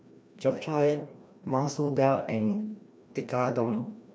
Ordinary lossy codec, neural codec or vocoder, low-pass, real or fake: none; codec, 16 kHz, 1 kbps, FreqCodec, larger model; none; fake